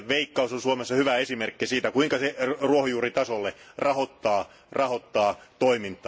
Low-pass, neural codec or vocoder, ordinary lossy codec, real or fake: none; none; none; real